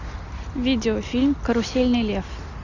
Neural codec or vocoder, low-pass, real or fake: none; 7.2 kHz; real